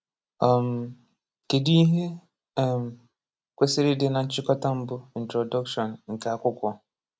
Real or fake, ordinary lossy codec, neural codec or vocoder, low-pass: real; none; none; none